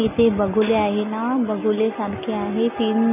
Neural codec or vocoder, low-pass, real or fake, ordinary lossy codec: none; 3.6 kHz; real; AAC, 32 kbps